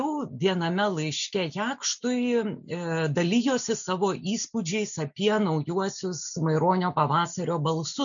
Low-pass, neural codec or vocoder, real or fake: 7.2 kHz; none; real